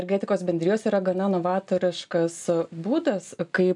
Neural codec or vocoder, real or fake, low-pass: none; real; 10.8 kHz